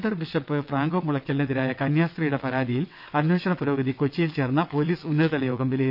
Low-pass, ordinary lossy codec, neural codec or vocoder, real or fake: 5.4 kHz; none; vocoder, 22.05 kHz, 80 mel bands, WaveNeXt; fake